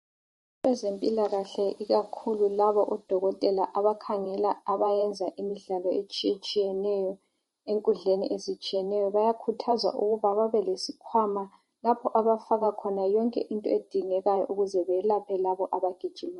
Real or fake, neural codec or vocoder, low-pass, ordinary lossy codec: fake; vocoder, 44.1 kHz, 128 mel bands every 512 samples, BigVGAN v2; 19.8 kHz; MP3, 48 kbps